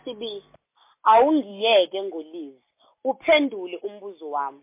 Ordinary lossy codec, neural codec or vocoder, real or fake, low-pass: MP3, 24 kbps; none; real; 3.6 kHz